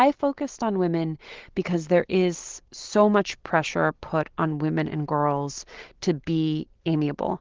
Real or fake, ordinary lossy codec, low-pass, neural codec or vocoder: real; Opus, 16 kbps; 7.2 kHz; none